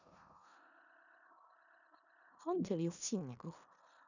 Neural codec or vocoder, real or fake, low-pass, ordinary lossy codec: codec, 16 kHz in and 24 kHz out, 0.4 kbps, LongCat-Audio-Codec, four codebook decoder; fake; 7.2 kHz; none